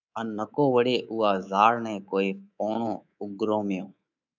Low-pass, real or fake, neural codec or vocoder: 7.2 kHz; fake; codec, 24 kHz, 3.1 kbps, DualCodec